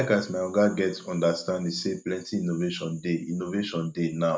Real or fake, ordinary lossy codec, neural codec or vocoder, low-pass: real; none; none; none